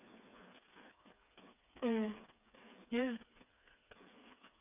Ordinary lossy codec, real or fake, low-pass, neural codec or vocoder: none; fake; 3.6 kHz; codec, 16 kHz, 4 kbps, FreqCodec, smaller model